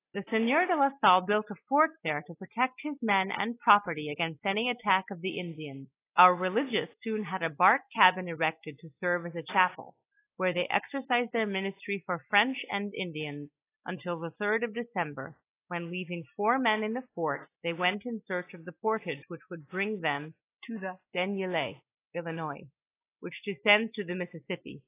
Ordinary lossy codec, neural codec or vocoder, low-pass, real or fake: AAC, 24 kbps; none; 3.6 kHz; real